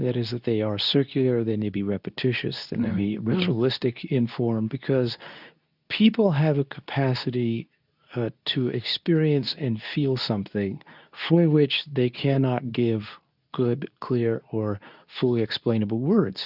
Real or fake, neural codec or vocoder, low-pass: fake; codec, 24 kHz, 0.9 kbps, WavTokenizer, medium speech release version 2; 5.4 kHz